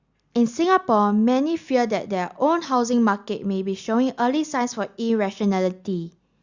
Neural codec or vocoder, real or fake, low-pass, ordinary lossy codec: none; real; 7.2 kHz; Opus, 64 kbps